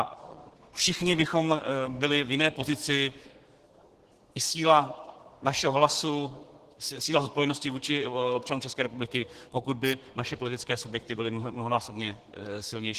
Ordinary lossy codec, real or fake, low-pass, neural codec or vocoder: Opus, 16 kbps; fake; 14.4 kHz; codec, 32 kHz, 1.9 kbps, SNAC